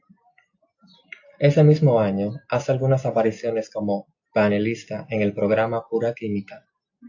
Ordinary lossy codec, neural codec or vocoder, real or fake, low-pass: AAC, 48 kbps; none; real; 7.2 kHz